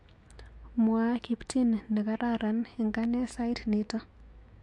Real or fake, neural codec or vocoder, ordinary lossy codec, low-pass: fake; autoencoder, 48 kHz, 128 numbers a frame, DAC-VAE, trained on Japanese speech; MP3, 64 kbps; 10.8 kHz